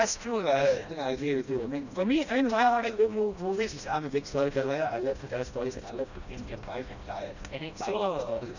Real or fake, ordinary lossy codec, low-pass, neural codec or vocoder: fake; none; 7.2 kHz; codec, 16 kHz, 1 kbps, FreqCodec, smaller model